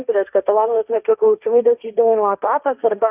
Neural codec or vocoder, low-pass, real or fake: codec, 16 kHz, 1.1 kbps, Voila-Tokenizer; 3.6 kHz; fake